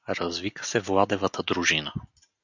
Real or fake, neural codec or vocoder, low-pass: real; none; 7.2 kHz